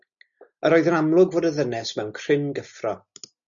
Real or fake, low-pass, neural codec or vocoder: real; 7.2 kHz; none